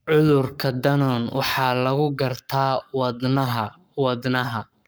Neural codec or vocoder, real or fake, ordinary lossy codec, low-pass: codec, 44.1 kHz, 7.8 kbps, Pupu-Codec; fake; none; none